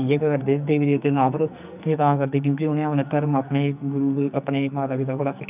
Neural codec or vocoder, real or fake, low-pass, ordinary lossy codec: codec, 44.1 kHz, 2.6 kbps, SNAC; fake; 3.6 kHz; none